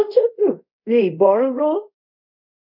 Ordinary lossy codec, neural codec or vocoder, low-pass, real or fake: none; codec, 24 kHz, 0.5 kbps, DualCodec; 5.4 kHz; fake